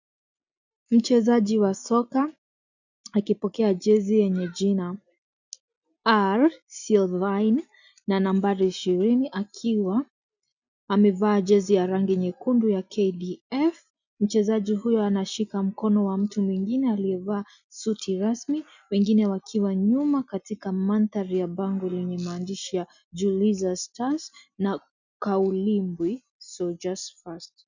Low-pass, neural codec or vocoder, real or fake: 7.2 kHz; none; real